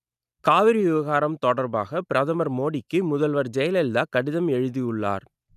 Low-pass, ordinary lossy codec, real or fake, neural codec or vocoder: 14.4 kHz; none; real; none